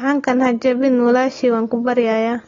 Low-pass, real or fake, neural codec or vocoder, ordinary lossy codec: 7.2 kHz; real; none; AAC, 32 kbps